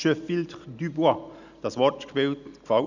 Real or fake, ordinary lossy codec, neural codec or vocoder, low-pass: real; none; none; 7.2 kHz